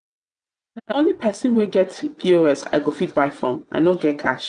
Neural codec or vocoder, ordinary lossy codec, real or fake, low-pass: vocoder, 22.05 kHz, 80 mel bands, WaveNeXt; none; fake; 9.9 kHz